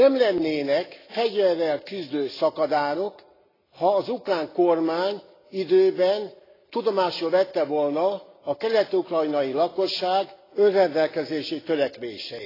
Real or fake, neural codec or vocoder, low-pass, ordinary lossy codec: real; none; 5.4 kHz; AAC, 24 kbps